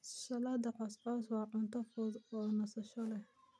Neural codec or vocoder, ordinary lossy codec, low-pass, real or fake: none; none; none; real